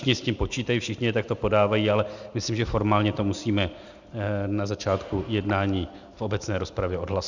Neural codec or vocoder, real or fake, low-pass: none; real; 7.2 kHz